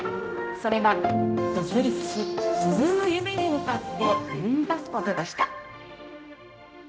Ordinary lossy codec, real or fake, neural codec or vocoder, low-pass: none; fake; codec, 16 kHz, 0.5 kbps, X-Codec, HuBERT features, trained on general audio; none